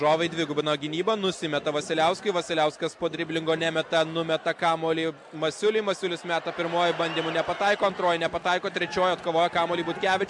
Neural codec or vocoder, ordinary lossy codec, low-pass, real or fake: none; MP3, 64 kbps; 10.8 kHz; real